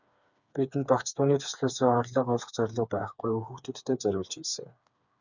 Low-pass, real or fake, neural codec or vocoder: 7.2 kHz; fake; codec, 16 kHz, 4 kbps, FreqCodec, smaller model